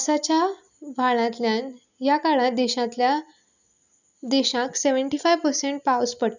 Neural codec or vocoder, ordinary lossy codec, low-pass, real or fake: none; none; 7.2 kHz; real